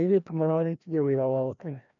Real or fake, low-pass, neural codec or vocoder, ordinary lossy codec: fake; 7.2 kHz; codec, 16 kHz, 1 kbps, FreqCodec, larger model; none